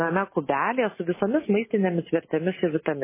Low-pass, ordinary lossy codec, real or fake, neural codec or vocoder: 3.6 kHz; MP3, 16 kbps; real; none